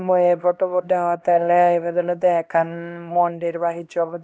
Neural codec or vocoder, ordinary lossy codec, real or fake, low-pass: codec, 16 kHz, 1 kbps, X-Codec, HuBERT features, trained on LibriSpeech; none; fake; none